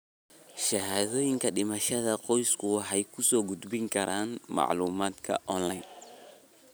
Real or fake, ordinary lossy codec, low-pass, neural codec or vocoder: real; none; none; none